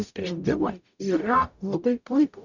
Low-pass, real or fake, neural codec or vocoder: 7.2 kHz; fake; codec, 44.1 kHz, 0.9 kbps, DAC